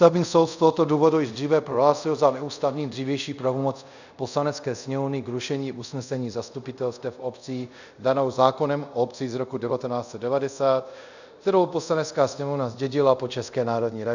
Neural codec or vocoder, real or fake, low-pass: codec, 24 kHz, 0.5 kbps, DualCodec; fake; 7.2 kHz